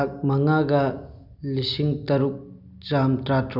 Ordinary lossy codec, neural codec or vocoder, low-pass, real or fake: none; none; 5.4 kHz; real